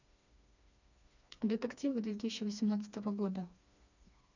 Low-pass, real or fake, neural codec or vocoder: 7.2 kHz; fake; codec, 16 kHz, 2 kbps, FreqCodec, smaller model